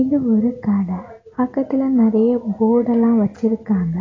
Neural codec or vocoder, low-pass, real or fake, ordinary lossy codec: none; 7.2 kHz; real; AAC, 32 kbps